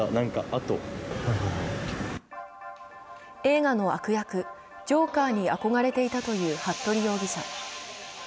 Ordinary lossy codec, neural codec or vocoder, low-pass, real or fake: none; none; none; real